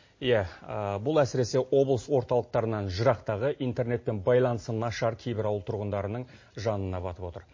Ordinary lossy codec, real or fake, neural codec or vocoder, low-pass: MP3, 32 kbps; real; none; 7.2 kHz